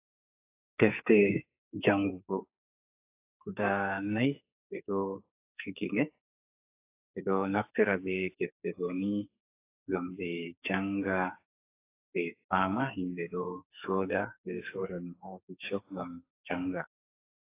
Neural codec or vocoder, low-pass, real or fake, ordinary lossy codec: codec, 44.1 kHz, 2.6 kbps, SNAC; 3.6 kHz; fake; AAC, 24 kbps